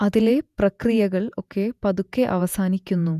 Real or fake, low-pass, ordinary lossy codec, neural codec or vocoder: fake; 14.4 kHz; none; vocoder, 48 kHz, 128 mel bands, Vocos